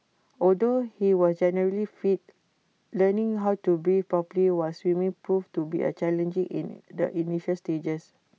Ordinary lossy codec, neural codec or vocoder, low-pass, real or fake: none; none; none; real